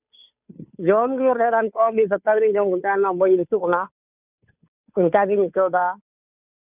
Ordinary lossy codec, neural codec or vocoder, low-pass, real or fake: none; codec, 16 kHz, 2 kbps, FunCodec, trained on Chinese and English, 25 frames a second; 3.6 kHz; fake